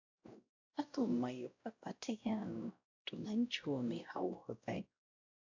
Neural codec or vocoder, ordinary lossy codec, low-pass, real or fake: codec, 16 kHz, 0.5 kbps, X-Codec, WavLM features, trained on Multilingual LibriSpeech; AAC, 48 kbps; 7.2 kHz; fake